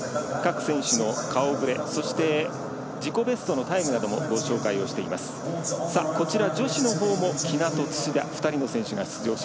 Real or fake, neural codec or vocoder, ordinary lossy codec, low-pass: real; none; none; none